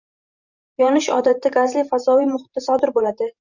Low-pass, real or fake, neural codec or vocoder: 7.2 kHz; fake; vocoder, 44.1 kHz, 128 mel bands every 512 samples, BigVGAN v2